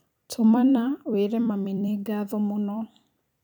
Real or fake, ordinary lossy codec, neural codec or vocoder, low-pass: fake; none; vocoder, 44.1 kHz, 128 mel bands every 256 samples, BigVGAN v2; 19.8 kHz